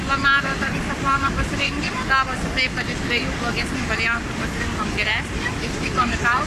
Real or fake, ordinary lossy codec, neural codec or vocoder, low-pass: fake; MP3, 96 kbps; codec, 44.1 kHz, 7.8 kbps, Pupu-Codec; 14.4 kHz